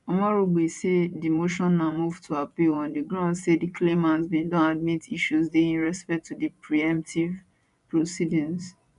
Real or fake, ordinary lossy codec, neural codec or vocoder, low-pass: fake; none; vocoder, 24 kHz, 100 mel bands, Vocos; 10.8 kHz